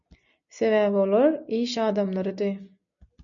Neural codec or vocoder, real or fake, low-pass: none; real; 7.2 kHz